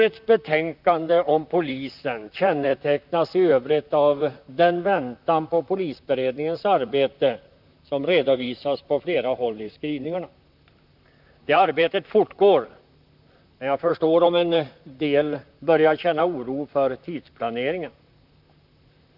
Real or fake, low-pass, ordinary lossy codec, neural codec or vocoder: fake; 5.4 kHz; none; vocoder, 44.1 kHz, 128 mel bands, Pupu-Vocoder